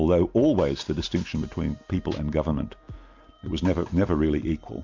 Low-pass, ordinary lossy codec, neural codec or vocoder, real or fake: 7.2 kHz; MP3, 64 kbps; none; real